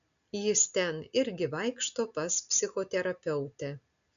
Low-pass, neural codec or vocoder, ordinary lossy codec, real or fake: 7.2 kHz; none; MP3, 96 kbps; real